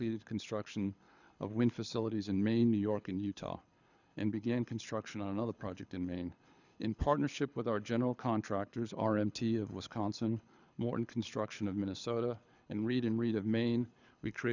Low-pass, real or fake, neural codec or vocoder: 7.2 kHz; fake; codec, 24 kHz, 6 kbps, HILCodec